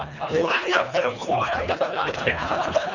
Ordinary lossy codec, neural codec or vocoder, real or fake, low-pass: none; codec, 24 kHz, 1.5 kbps, HILCodec; fake; 7.2 kHz